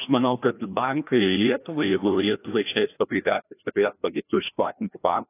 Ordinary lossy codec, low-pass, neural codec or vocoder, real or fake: AAC, 24 kbps; 3.6 kHz; codec, 16 kHz, 1 kbps, FreqCodec, larger model; fake